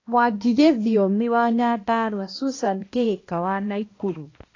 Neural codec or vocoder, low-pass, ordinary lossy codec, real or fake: codec, 16 kHz, 1 kbps, X-Codec, HuBERT features, trained on balanced general audio; 7.2 kHz; AAC, 32 kbps; fake